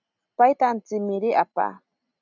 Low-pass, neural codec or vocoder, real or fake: 7.2 kHz; none; real